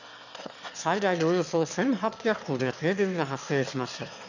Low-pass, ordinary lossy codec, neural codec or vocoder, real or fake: 7.2 kHz; none; autoencoder, 22.05 kHz, a latent of 192 numbers a frame, VITS, trained on one speaker; fake